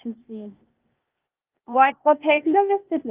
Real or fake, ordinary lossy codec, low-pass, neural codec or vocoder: fake; Opus, 24 kbps; 3.6 kHz; codec, 16 kHz, 0.8 kbps, ZipCodec